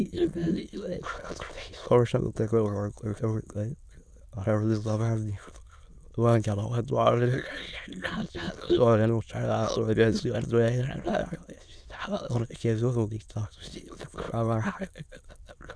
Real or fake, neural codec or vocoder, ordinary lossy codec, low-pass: fake; autoencoder, 22.05 kHz, a latent of 192 numbers a frame, VITS, trained on many speakers; none; none